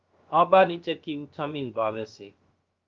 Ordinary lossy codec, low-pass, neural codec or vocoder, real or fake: Opus, 32 kbps; 7.2 kHz; codec, 16 kHz, about 1 kbps, DyCAST, with the encoder's durations; fake